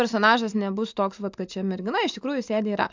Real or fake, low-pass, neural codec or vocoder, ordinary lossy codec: real; 7.2 kHz; none; MP3, 64 kbps